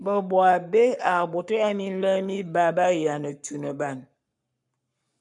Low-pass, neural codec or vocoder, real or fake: 10.8 kHz; codec, 44.1 kHz, 7.8 kbps, Pupu-Codec; fake